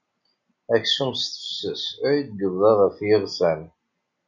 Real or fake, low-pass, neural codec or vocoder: real; 7.2 kHz; none